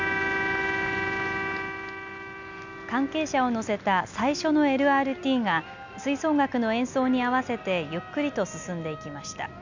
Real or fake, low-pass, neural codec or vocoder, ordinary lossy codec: real; 7.2 kHz; none; none